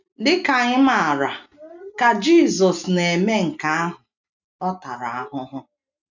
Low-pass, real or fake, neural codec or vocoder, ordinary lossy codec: 7.2 kHz; real; none; none